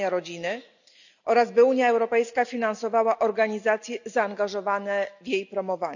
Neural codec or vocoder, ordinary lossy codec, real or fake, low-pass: none; none; real; 7.2 kHz